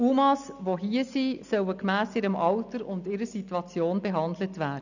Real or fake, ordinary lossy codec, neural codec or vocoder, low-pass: real; none; none; 7.2 kHz